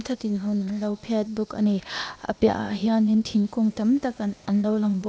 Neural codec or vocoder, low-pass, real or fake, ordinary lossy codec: codec, 16 kHz, 0.8 kbps, ZipCodec; none; fake; none